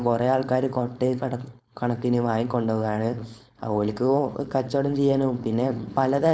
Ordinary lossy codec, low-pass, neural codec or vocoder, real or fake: none; none; codec, 16 kHz, 4.8 kbps, FACodec; fake